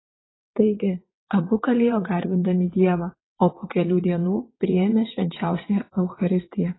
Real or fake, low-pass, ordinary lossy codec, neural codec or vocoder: fake; 7.2 kHz; AAC, 16 kbps; vocoder, 22.05 kHz, 80 mel bands, WaveNeXt